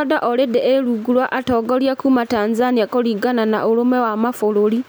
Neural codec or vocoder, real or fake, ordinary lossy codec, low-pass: none; real; none; none